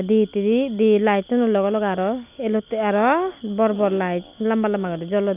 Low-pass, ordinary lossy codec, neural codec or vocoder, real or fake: 3.6 kHz; AAC, 32 kbps; none; real